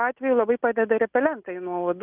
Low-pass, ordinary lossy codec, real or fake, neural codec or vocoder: 3.6 kHz; Opus, 24 kbps; real; none